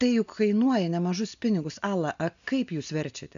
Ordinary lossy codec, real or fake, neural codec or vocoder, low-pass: MP3, 64 kbps; real; none; 7.2 kHz